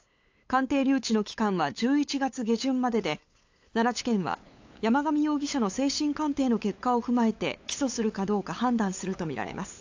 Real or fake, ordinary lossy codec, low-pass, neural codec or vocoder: fake; AAC, 48 kbps; 7.2 kHz; codec, 16 kHz, 8 kbps, FunCodec, trained on LibriTTS, 25 frames a second